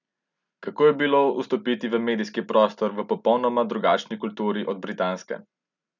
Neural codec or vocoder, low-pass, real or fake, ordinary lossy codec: none; 7.2 kHz; real; none